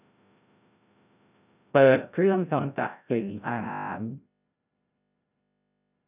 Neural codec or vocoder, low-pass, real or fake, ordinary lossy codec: codec, 16 kHz, 0.5 kbps, FreqCodec, larger model; 3.6 kHz; fake; none